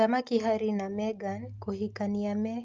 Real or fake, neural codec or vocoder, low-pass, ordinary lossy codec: real; none; 7.2 kHz; Opus, 32 kbps